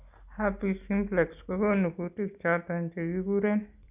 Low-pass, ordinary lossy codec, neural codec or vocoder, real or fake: 3.6 kHz; none; none; real